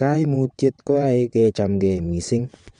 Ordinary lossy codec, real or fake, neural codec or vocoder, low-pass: MP3, 64 kbps; fake; vocoder, 22.05 kHz, 80 mel bands, WaveNeXt; 9.9 kHz